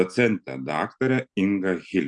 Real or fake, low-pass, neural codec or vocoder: real; 9.9 kHz; none